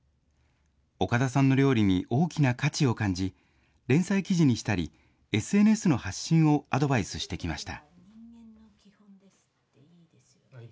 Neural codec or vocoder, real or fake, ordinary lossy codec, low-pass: none; real; none; none